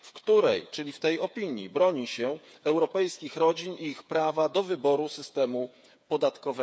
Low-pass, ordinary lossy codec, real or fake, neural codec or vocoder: none; none; fake; codec, 16 kHz, 8 kbps, FreqCodec, smaller model